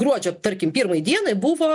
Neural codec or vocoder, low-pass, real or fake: none; 10.8 kHz; real